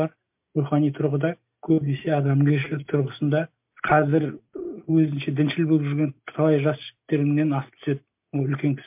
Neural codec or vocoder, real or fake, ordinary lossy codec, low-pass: none; real; MP3, 32 kbps; 3.6 kHz